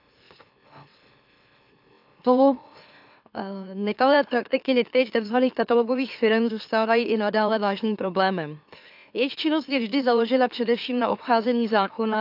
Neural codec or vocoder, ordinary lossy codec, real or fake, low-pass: autoencoder, 44.1 kHz, a latent of 192 numbers a frame, MeloTTS; AAC, 48 kbps; fake; 5.4 kHz